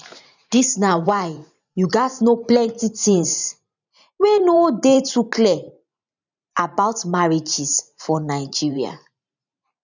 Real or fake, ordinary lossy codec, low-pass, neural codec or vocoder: fake; none; 7.2 kHz; vocoder, 44.1 kHz, 128 mel bands every 512 samples, BigVGAN v2